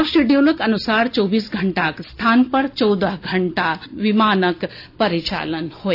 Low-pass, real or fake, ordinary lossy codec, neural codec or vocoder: 5.4 kHz; real; AAC, 32 kbps; none